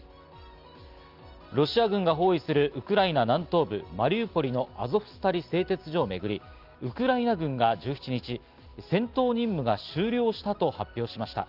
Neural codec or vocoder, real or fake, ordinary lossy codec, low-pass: none; real; Opus, 32 kbps; 5.4 kHz